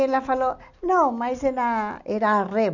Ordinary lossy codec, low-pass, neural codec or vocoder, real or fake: none; 7.2 kHz; none; real